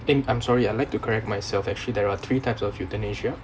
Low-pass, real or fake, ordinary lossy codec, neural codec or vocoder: none; real; none; none